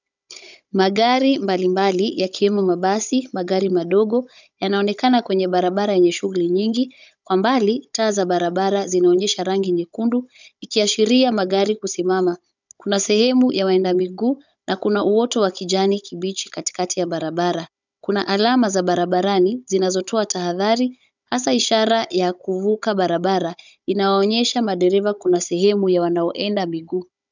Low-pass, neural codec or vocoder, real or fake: 7.2 kHz; codec, 16 kHz, 16 kbps, FunCodec, trained on Chinese and English, 50 frames a second; fake